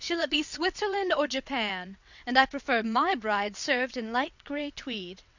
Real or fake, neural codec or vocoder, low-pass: fake; vocoder, 22.05 kHz, 80 mel bands, WaveNeXt; 7.2 kHz